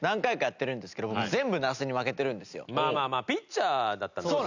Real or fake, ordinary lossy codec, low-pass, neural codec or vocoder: real; none; 7.2 kHz; none